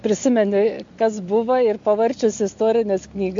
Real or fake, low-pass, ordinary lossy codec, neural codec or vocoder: real; 7.2 kHz; MP3, 48 kbps; none